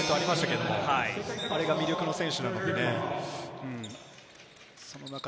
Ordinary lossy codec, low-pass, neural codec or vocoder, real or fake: none; none; none; real